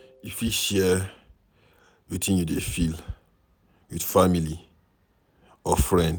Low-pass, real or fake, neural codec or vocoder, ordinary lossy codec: none; real; none; none